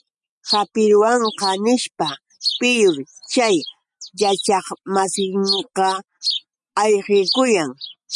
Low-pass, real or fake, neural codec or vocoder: 10.8 kHz; real; none